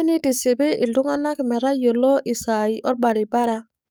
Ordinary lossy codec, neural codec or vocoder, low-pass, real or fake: none; codec, 44.1 kHz, 7.8 kbps, DAC; none; fake